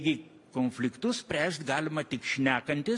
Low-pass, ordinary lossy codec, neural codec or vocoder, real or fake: 10.8 kHz; AAC, 64 kbps; none; real